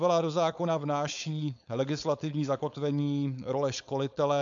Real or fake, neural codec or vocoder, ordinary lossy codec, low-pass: fake; codec, 16 kHz, 4.8 kbps, FACodec; AAC, 64 kbps; 7.2 kHz